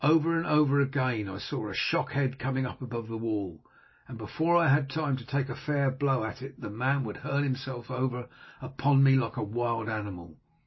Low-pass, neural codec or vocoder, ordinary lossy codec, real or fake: 7.2 kHz; none; MP3, 24 kbps; real